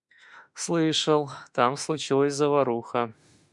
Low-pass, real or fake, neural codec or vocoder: 10.8 kHz; fake; autoencoder, 48 kHz, 32 numbers a frame, DAC-VAE, trained on Japanese speech